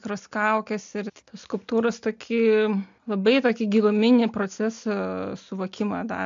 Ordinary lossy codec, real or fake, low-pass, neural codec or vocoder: AAC, 64 kbps; real; 7.2 kHz; none